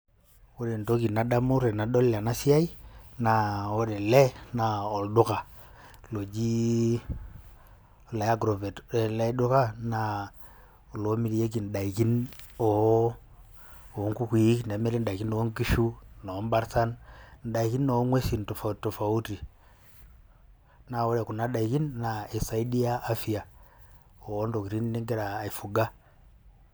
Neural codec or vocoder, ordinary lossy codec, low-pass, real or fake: none; none; none; real